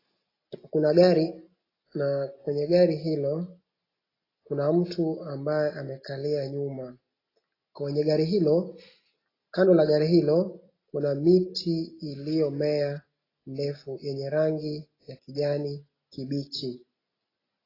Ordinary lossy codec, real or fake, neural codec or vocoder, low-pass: AAC, 24 kbps; real; none; 5.4 kHz